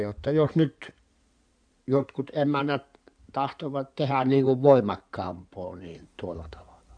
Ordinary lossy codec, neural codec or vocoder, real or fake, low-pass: none; codec, 16 kHz in and 24 kHz out, 2.2 kbps, FireRedTTS-2 codec; fake; 9.9 kHz